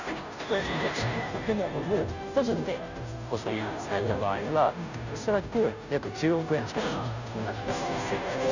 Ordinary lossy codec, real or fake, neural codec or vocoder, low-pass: none; fake; codec, 16 kHz, 0.5 kbps, FunCodec, trained on Chinese and English, 25 frames a second; 7.2 kHz